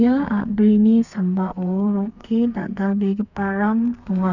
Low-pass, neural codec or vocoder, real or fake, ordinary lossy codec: 7.2 kHz; codec, 32 kHz, 1.9 kbps, SNAC; fake; none